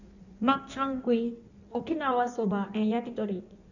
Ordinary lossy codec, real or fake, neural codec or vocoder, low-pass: none; fake; codec, 16 kHz in and 24 kHz out, 1.1 kbps, FireRedTTS-2 codec; 7.2 kHz